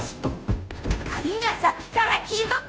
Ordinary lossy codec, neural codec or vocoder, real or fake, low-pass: none; codec, 16 kHz, 0.5 kbps, FunCodec, trained on Chinese and English, 25 frames a second; fake; none